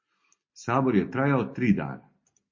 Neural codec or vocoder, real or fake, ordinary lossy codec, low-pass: none; real; MP3, 32 kbps; 7.2 kHz